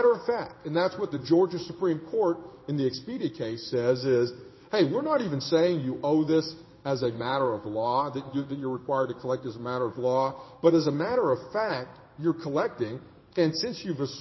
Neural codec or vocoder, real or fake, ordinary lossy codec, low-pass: codec, 16 kHz in and 24 kHz out, 1 kbps, XY-Tokenizer; fake; MP3, 24 kbps; 7.2 kHz